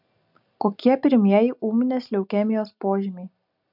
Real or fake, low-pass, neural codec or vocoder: real; 5.4 kHz; none